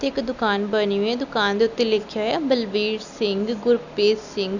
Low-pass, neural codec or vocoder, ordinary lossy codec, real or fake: 7.2 kHz; none; none; real